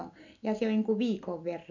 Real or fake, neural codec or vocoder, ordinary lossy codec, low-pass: real; none; none; 7.2 kHz